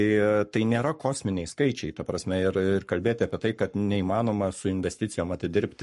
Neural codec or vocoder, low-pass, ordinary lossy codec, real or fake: codec, 44.1 kHz, 7.8 kbps, Pupu-Codec; 14.4 kHz; MP3, 48 kbps; fake